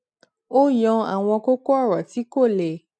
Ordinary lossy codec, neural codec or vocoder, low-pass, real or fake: none; none; none; real